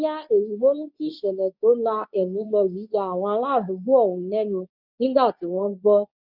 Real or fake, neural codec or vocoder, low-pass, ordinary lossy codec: fake; codec, 24 kHz, 0.9 kbps, WavTokenizer, medium speech release version 2; 5.4 kHz; none